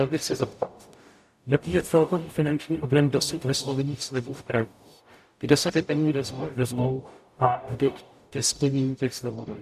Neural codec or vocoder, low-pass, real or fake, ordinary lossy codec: codec, 44.1 kHz, 0.9 kbps, DAC; 14.4 kHz; fake; AAC, 96 kbps